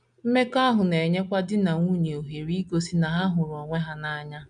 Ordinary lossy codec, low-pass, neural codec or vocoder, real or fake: none; 9.9 kHz; none; real